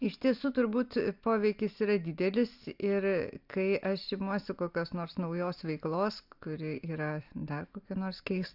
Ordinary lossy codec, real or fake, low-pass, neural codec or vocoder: AAC, 48 kbps; real; 5.4 kHz; none